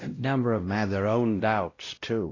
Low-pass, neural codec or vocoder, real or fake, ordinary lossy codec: 7.2 kHz; codec, 16 kHz, 0.5 kbps, X-Codec, WavLM features, trained on Multilingual LibriSpeech; fake; AAC, 32 kbps